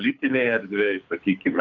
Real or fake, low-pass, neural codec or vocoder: fake; 7.2 kHz; codec, 24 kHz, 6 kbps, HILCodec